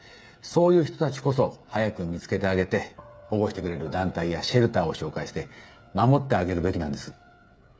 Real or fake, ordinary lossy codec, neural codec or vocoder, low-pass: fake; none; codec, 16 kHz, 8 kbps, FreqCodec, smaller model; none